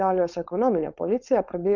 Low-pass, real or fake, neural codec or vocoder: 7.2 kHz; fake; codec, 16 kHz, 4.8 kbps, FACodec